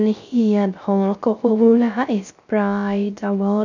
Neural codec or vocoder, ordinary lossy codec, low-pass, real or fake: codec, 16 kHz, 0.3 kbps, FocalCodec; none; 7.2 kHz; fake